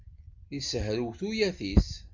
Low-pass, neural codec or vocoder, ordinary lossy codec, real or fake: 7.2 kHz; none; MP3, 64 kbps; real